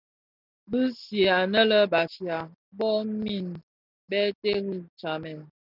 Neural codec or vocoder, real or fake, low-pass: none; real; 5.4 kHz